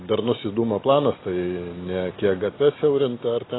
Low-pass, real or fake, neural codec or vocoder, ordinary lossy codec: 7.2 kHz; real; none; AAC, 16 kbps